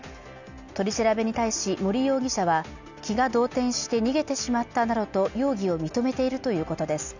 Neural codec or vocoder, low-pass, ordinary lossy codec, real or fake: none; 7.2 kHz; none; real